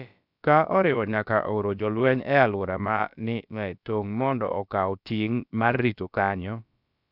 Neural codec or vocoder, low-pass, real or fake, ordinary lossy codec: codec, 16 kHz, about 1 kbps, DyCAST, with the encoder's durations; 5.4 kHz; fake; none